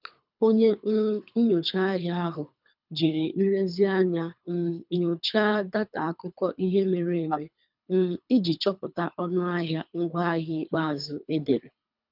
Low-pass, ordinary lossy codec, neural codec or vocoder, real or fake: 5.4 kHz; none; codec, 24 kHz, 3 kbps, HILCodec; fake